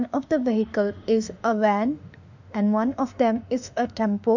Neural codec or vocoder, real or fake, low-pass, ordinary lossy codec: autoencoder, 48 kHz, 32 numbers a frame, DAC-VAE, trained on Japanese speech; fake; 7.2 kHz; none